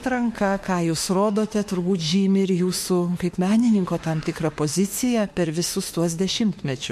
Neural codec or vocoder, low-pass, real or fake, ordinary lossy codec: autoencoder, 48 kHz, 32 numbers a frame, DAC-VAE, trained on Japanese speech; 14.4 kHz; fake; MP3, 64 kbps